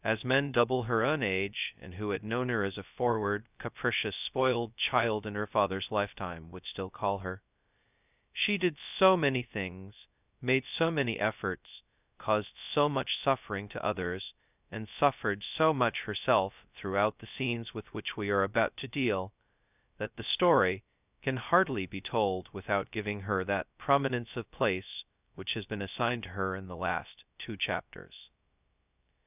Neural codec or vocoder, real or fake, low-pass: codec, 16 kHz, 0.2 kbps, FocalCodec; fake; 3.6 kHz